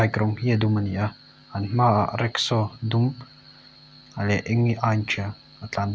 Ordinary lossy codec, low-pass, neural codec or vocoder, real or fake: none; none; none; real